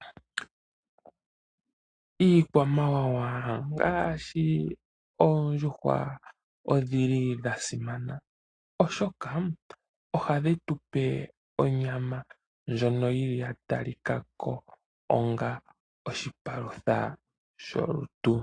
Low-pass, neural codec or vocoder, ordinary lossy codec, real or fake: 9.9 kHz; none; AAC, 32 kbps; real